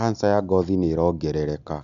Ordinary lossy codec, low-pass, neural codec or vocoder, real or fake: none; 7.2 kHz; none; real